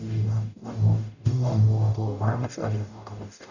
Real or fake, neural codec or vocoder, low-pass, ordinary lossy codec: fake; codec, 44.1 kHz, 0.9 kbps, DAC; 7.2 kHz; none